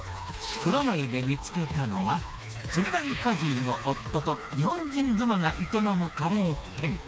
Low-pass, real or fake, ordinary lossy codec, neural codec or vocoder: none; fake; none; codec, 16 kHz, 2 kbps, FreqCodec, smaller model